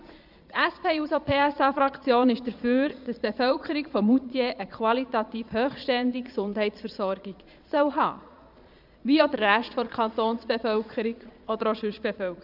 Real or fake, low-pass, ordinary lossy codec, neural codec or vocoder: fake; 5.4 kHz; none; vocoder, 22.05 kHz, 80 mel bands, Vocos